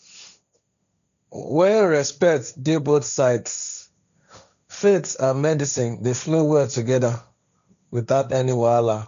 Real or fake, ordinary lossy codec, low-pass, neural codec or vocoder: fake; none; 7.2 kHz; codec, 16 kHz, 1.1 kbps, Voila-Tokenizer